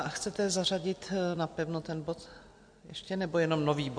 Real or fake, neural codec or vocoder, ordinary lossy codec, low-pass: real; none; MP3, 48 kbps; 9.9 kHz